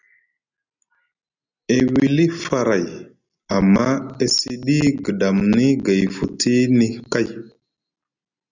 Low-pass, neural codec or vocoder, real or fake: 7.2 kHz; none; real